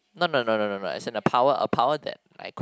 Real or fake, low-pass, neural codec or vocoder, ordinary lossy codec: real; none; none; none